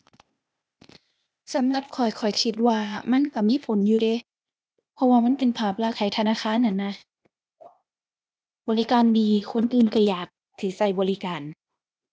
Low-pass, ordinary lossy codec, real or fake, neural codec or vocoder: none; none; fake; codec, 16 kHz, 0.8 kbps, ZipCodec